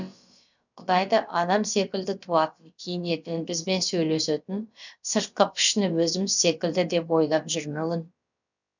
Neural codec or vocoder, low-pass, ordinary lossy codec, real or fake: codec, 16 kHz, about 1 kbps, DyCAST, with the encoder's durations; 7.2 kHz; none; fake